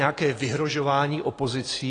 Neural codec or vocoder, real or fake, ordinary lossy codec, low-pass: none; real; AAC, 32 kbps; 9.9 kHz